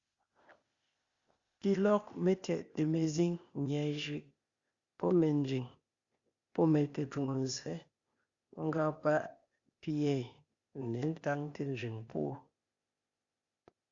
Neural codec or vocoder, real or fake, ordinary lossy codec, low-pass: codec, 16 kHz, 0.8 kbps, ZipCodec; fake; Opus, 64 kbps; 7.2 kHz